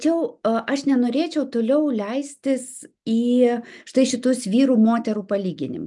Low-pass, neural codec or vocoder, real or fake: 10.8 kHz; none; real